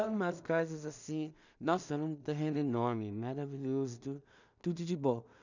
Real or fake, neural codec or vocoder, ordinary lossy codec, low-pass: fake; codec, 16 kHz in and 24 kHz out, 0.4 kbps, LongCat-Audio-Codec, two codebook decoder; none; 7.2 kHz